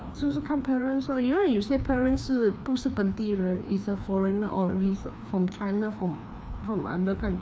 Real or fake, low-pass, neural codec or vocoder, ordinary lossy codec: fake; none; codec, 16 kHz, 2 kbps, FreqCodec, larger model; none